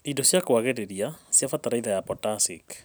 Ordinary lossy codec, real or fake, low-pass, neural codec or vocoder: none; real; none; none